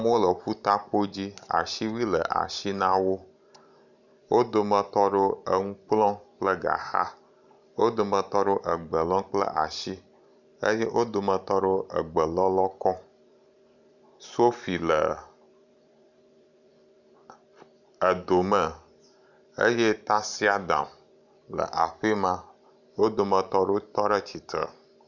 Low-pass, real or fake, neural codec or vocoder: 7.2 kHz; fake; vocoder, 44.1 kHz, 128 mel bands every 512 samples, BigVGAN v2